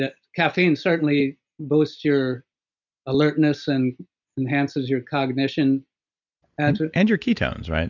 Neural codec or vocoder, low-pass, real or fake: none; 7.2 kHz; real